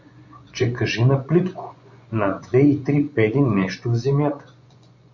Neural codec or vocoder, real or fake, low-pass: vocoder, 24 kHz, 100 mel bands, Vocos; fake; 7.2 kHz